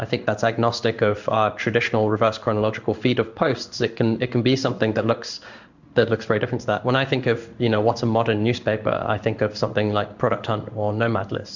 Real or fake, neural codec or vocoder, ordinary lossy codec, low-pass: fake; codec, 16 kHz in and 24 kHz out, 1 kbps, XY-Tokenizer; Opus, 64 kbps; 7.2 kHz